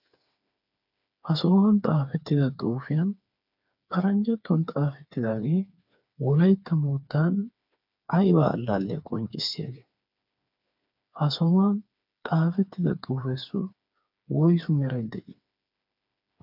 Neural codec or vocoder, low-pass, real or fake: codec, 16 kHz, 4 kbps, FreqCodec, smaller model; 5.4 kHz; fake